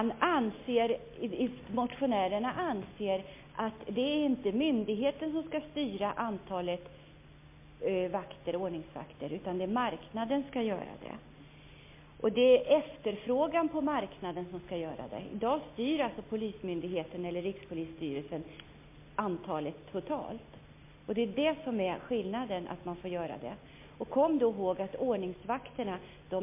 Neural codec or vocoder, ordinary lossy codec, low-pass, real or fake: none; MP3, 24 kbps; 3.6 kHz; real